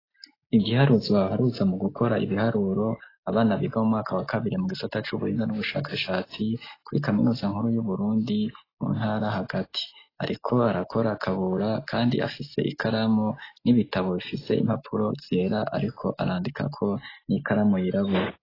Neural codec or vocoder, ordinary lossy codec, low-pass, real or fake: none; AAC, 24 kbps; 5.4 kHz; real